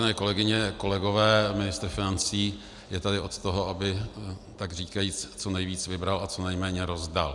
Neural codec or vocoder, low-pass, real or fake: none; 10.8 kHz; real